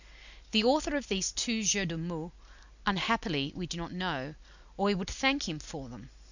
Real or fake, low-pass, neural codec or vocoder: real; 7.2 kHz; none